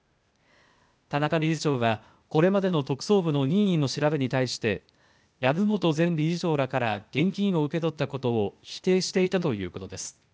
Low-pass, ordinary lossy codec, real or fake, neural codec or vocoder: none; none; fake; codec, 16 kHz, 0.8 kbps, ZipCodec